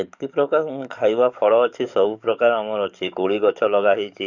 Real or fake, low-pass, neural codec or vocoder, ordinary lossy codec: fake; 7.2 kHz; codec, 44.1 kHz, 7.8 kbps, DAC; none